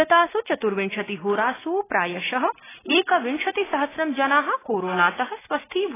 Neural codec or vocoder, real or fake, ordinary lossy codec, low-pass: none; real; AAC, 16 kbps; 3.6 kHz